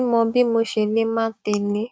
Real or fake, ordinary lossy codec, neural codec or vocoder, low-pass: fake; none; codec, 16 kHz, 6 kbps, DAC; none